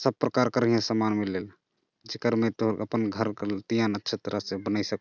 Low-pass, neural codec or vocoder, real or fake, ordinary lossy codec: 7.2 kHz; none; real; none